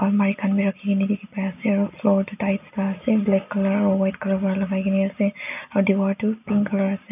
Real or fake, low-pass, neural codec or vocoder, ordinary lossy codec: real; 3.6 kHz; none; none